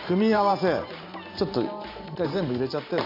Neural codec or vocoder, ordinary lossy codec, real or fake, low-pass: vocoder, 44.1 kHz, 128 mel bands every 512 samples, BigVGAN v2; MP3, 32 kbps; fake; 5.4 kHz